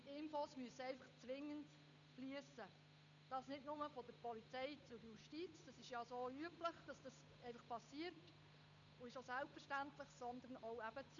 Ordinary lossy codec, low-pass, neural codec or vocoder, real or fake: none; 7.2 kHz; codec, 16 kHz, 8 kbps, FunCodec, trained on Chinese and English, 25 frames a second; fake